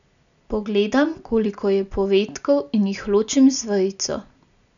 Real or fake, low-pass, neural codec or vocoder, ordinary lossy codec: real; 7.2 kHz; none; none